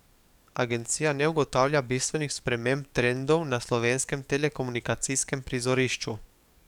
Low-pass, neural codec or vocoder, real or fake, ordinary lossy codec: 19.8 kHz; codec, 44.1 kHz, 7.8 kbps, DAC; fake; none